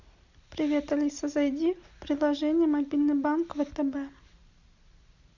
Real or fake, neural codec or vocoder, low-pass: real; none; 7.2 kHz